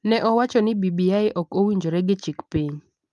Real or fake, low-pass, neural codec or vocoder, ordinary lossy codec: real; 10.8 kHz; none; Opus, 32 kbps